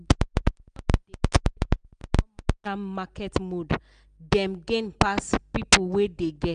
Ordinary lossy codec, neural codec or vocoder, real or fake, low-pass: none; none; real; 9.9 kHz